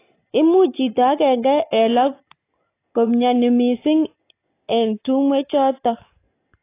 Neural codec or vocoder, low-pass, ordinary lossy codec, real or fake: none; 3.6 kHz; AAC, 24 kbps; real